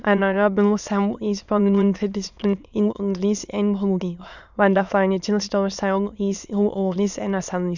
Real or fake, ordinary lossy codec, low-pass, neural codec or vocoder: fake; none; 7.2 kHz; autoencoder, 22.05 kHz, a latent of 192 numbers a frame, VITS, trained on many speakers